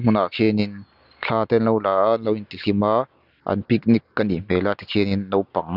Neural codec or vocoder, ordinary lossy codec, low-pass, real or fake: none; none; 5.4 kHz; real